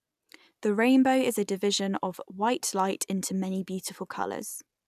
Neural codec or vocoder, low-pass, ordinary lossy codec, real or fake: none; 14.4 kHz; none; real